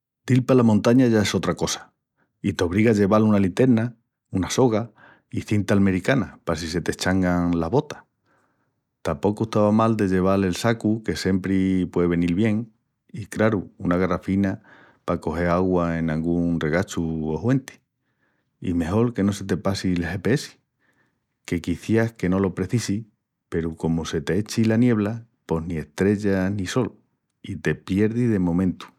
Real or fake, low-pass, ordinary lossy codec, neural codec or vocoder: real; 19.8 kHz; none; none